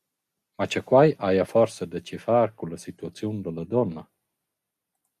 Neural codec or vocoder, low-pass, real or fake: none; 14.4 kHz; real